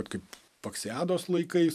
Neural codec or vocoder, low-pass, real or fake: vocoder, 44.1 kHz, 128 mel bands every 512 samples, BigVGAN v2; 14.4 kHz; fake